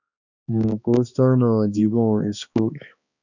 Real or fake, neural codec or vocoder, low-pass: fake; codec, 16 kHz, 2 kbps, X-Codec, HuBERT features, trained on balanced general audio; 7.2 kHz